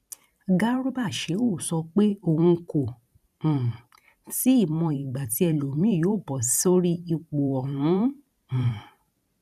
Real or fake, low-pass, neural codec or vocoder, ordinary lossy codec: fake; 14.4 kHz; vocoder, 44.1 kHz, 128 mel bands every 512 samples, BigVGAN v2; none